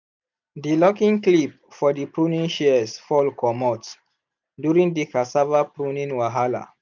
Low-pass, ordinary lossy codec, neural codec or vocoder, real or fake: 7.2 kHz; none; none; real